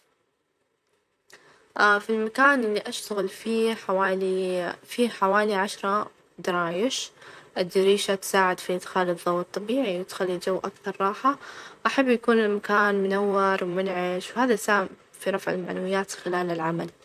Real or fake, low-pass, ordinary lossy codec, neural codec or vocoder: fake; 14.4 kHz; none; vocoder, 44.1 kHz, 128 mel bands, Pupu-Vocoder